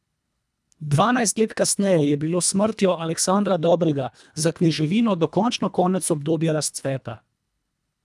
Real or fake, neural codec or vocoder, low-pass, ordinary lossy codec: fake; codec, 24 kHz, 1.5 kbps, HILCodec; none; none